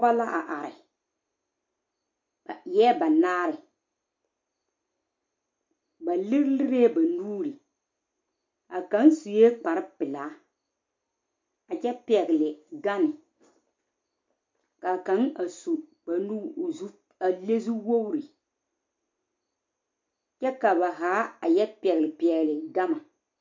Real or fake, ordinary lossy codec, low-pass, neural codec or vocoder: real; MP3, 48 kbps; 7.2 kHz; none